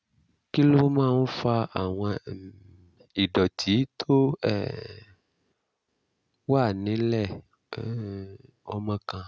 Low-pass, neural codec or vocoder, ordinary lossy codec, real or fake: none; none; none; real